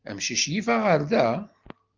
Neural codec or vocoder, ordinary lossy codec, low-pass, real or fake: none; Opus, 32 kbps; 7.2 kHz; real